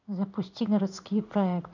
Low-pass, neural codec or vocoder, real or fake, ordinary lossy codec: 7.2 kHz; codec, 16 kHz in and 24 kHz out, 1 kbps, XY-Tokenizer; fake; none